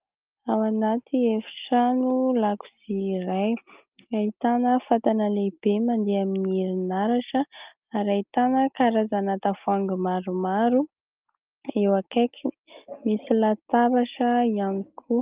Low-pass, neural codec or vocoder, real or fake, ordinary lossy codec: 3.6 kHz; none; real; Opus, 32 kbps